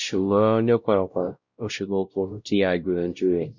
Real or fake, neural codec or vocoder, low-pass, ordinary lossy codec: fake; codec, 16 kHz, 0.5 kbps, X-Codec, WavLM features, trained on Multilingual LibriSpeech; 7.2 kHz; Opus, 64 kbps